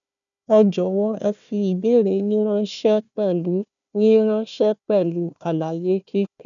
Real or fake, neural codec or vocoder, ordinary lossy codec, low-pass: fake; codec, 16 kHz, 1 kbps, FunCodec, trained on Chinese and English, 50 frames a second; none; 7.2 kHz